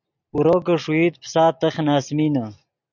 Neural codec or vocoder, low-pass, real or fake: none; 7.2 kHz; real